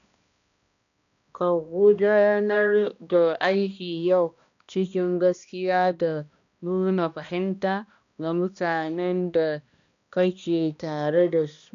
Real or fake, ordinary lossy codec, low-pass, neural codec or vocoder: fake; none; 7.2 kHz; codec, 16 kHz, 1 kbps, X-Codec, HuBERT features, trained on balanced general audio